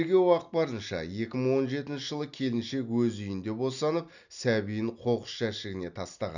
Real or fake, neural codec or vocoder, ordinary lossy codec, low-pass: real; none; none; 7.2 kHz